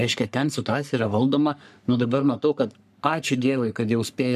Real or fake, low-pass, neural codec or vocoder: fake; 14.4 kHz; codec, 44.1 kHz, 3.4 kbps, Pupu-Codec